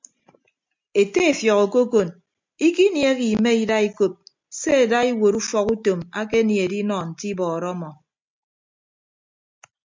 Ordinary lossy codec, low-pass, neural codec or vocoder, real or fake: MP3, 64 kbps; 7.2 kHz; none; real